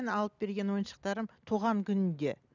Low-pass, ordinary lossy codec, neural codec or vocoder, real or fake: 7.2 kHz; none; none; real